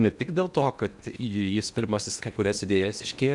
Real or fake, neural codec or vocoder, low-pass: fake; codec, 16 kHz in and 24 kHz out, 0.8 kbps, FocalCodec, streaming, 65536 codes; 10.8 kHz